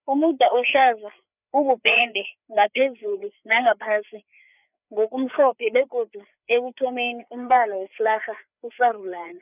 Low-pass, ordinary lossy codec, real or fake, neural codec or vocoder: 3.6 kHz; none; fake; codec, 16 kHz, 4 kbps, FreqCodec, larger model